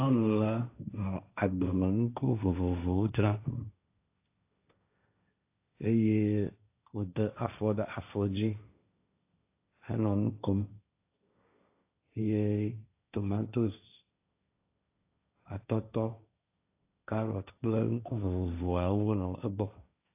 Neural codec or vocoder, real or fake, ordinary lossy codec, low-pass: codec, 16 kHz, 1.1 kbps, Voila-Tokenizer; fake; AAC, 24 kbps; 3.6 kHz